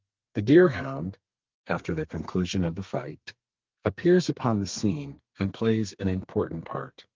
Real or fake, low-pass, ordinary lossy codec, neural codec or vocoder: fake; 7.2 kHz; Opus, 32 kbps; codec, 32 kHz, 1.9 kbps, SNAC